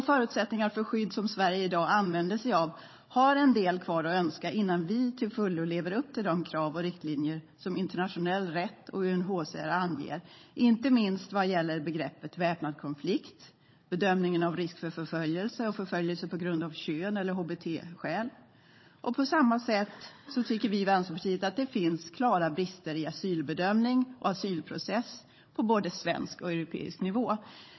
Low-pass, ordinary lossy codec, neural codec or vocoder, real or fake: 7.2 kHz; MP3, 24 kbps; codec, 16 kHz, 16 kbps, FunCodec, trained on Chinese and English, 50 frames a second; fake